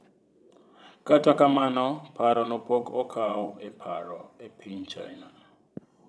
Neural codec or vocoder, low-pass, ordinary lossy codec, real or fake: vocoder, 22.05 kHz, 80 mel bands, Vocos; none; none; fake